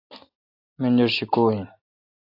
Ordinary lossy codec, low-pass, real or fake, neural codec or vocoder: AAC, 48 kbps; 5.4 kHz; real; none